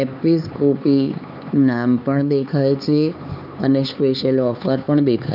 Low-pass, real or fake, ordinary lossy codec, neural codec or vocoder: 5.4 kHz; fake; none; codec, 16 kHz, 4 kbps, X-Codec, HuBERT features, trained on LibriSpeech